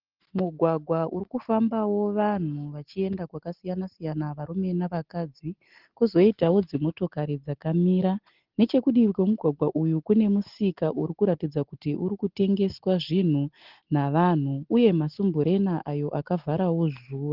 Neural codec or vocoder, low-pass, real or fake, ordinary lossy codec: none; 5.4 kHz; real; Opus, 16 kbps